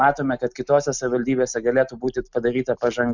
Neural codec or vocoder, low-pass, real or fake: none; 7.2 kHz; real